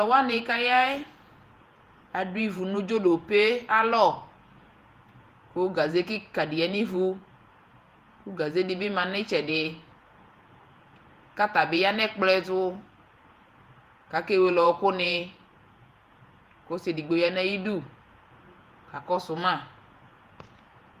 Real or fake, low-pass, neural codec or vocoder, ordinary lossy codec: fake; 14.4 kHz; vocoder, 48 kHz, 128 mel bands, Vocos; Opus, 24 kbps